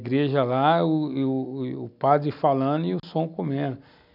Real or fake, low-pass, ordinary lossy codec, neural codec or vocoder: real; 5.4 kHz; none; none